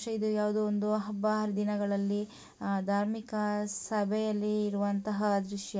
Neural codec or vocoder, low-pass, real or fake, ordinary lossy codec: none; none; real; none